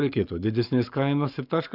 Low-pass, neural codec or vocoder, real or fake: 5.4 kHz; codec, 44.1 kHz, 7.8 kbps, Pupu-Codec; fake